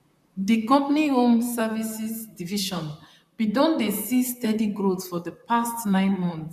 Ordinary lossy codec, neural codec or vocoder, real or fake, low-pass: none; vocoder, 44.1 kHz, 128 mel bands, Pupu-Vocoder; fake; 14.4 kHz